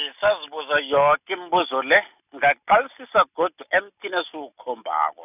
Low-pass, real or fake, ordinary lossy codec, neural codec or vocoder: 3.6 kHz; real; none; none